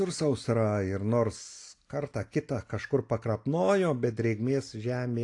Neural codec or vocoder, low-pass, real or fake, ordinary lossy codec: none; 10.8 kHz; real; AAC, 48 kbps